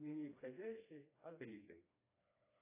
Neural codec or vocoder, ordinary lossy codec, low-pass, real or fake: codec, 16 kHz, 2 kbps, FreqCodec, smaller model; AAC, 24 kbps; 3.6 kHz; fake